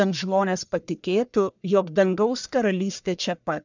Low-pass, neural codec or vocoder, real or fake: 7.2 kHz; codec, 44.1 kHz, 1.7 kbps, Pupu-Codec; fake